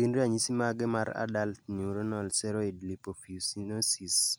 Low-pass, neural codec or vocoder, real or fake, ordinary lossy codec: none; none; real; none